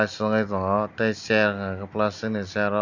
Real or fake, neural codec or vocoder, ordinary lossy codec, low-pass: real; none; none; 7.2 kHz